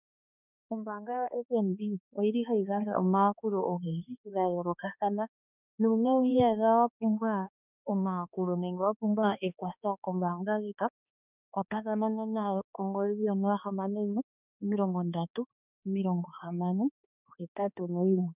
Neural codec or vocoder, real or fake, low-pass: codec, 16 kHz, 2 kbps, X-Codec, HuBERT features, trained on balanced general audio; fake; 3.6 kHz